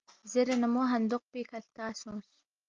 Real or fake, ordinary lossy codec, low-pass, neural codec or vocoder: real; Opus, 32 kbps; 7.2 kHz; none